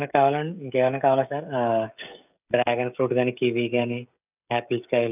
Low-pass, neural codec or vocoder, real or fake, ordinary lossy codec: 3.6 kHz; codec, 16 kHz, 16 kbps, FreqCodec, smaller model; fake; none